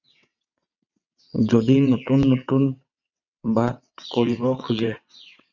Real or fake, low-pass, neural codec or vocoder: fake; 7.2 kHz; vocoder, 22.05 kHz, 80 mel bands, WaveNeXt